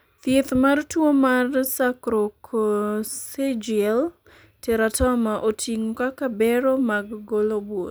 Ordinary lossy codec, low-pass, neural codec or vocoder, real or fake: none; none; none; real